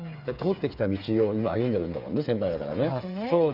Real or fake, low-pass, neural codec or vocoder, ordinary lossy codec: fake; 5.4 kHz; codec, 16 kHz, 8 kbps, FreqCodec, smaller model; none